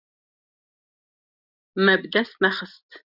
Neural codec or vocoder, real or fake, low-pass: none; real; 5.4 kHz